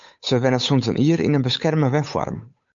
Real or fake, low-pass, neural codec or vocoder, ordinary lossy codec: fake; 7.2 kHz; codec, 16 kHz, 8 kbps, FunCodec, trained on Chinese and English, 25 frames a second; MP3, 64 kbps